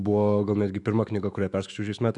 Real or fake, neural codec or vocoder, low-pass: fake; autoencoder, 48 kHz, 128 numbers a frame, DAC-VAE, trained on Japanese speech; 10.8 kHz